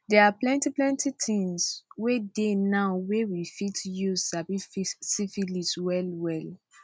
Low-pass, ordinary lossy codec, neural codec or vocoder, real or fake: none; none; none; real